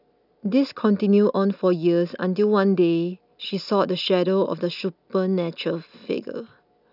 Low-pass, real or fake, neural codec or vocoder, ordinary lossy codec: 5.4 kHz; real; none; none